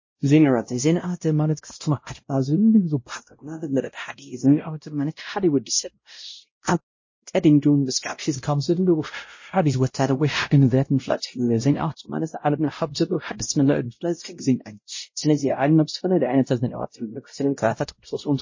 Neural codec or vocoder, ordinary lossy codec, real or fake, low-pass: codec, 16 kHz, 0.5 kbps, X-Codec, WavLM features, trained on Multilingual LibriSpeech; MP3, 32 kbps; fake; 7.2 kHz